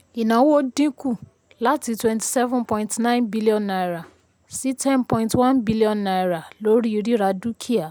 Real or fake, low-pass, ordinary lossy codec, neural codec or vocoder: real; none; none; none